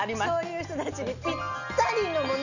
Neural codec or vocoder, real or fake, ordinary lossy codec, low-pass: none; real; none; 7.2 kHz